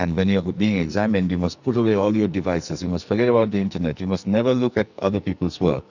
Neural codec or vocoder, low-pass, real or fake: codec, 44.1 kHz, 2.6 kbps, SNAC; 7.2 kHz; fake